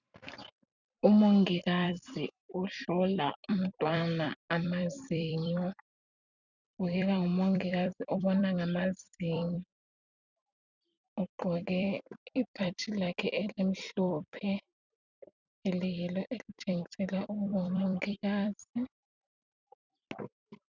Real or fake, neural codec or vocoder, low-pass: fake; vocoder, 44.1 kHz, 128 mel bands every 256 samples, BigVGAN v2; 7.2 kHz